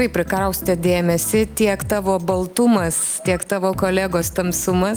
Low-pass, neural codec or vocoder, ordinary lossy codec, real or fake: 19.8 kHz; none; Opus, 32 kbps; real